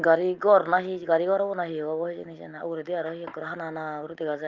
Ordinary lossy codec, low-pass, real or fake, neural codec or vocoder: Opus, 32 kbps; 7.2 kHz; real; none